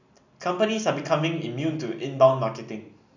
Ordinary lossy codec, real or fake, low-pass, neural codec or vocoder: none; real; 7.2 kHz; none